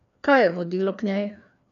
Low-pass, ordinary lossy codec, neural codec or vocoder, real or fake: 7.2 kHz; none; codec, 16 kHz, 2 kbps, FreqCodec, larger model; fake